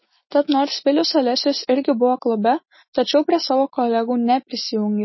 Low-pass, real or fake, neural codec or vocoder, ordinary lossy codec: 7.2 kHz; real; none; MP3, 24 kbps